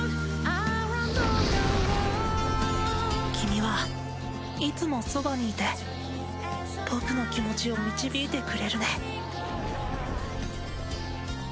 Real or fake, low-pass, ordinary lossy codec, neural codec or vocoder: real; none; none; none